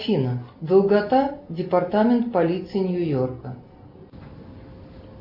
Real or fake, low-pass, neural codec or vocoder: real; 5.4 kHz; none